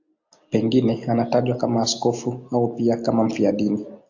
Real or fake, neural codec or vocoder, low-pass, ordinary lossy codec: real; none; 7.2 kHz; AAC, 48 kbps